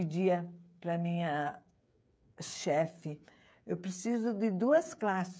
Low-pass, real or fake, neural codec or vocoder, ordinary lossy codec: none; fake; codec, 16 kHz, 8 kbps, FreqCodec, smaller model; none